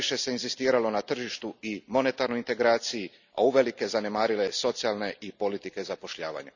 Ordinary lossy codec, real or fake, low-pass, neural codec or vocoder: none; real; 7.2 kHz; none